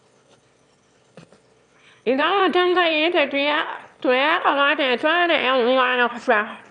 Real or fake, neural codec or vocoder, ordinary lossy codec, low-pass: fake; autoencoder, 22.05 kHz, a latent of 192 numbers a frame, VITS, trained on one speaker; none; 9.9 kHz